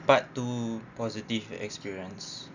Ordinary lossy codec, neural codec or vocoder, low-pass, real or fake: none; none; 7.2 kHz; real